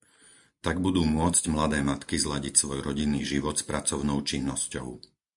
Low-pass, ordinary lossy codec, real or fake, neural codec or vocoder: 10.8 kHz; MP3, 64 kbps; real; none